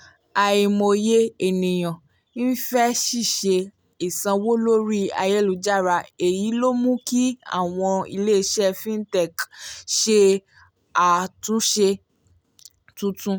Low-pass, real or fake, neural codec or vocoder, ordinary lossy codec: none; real; none; none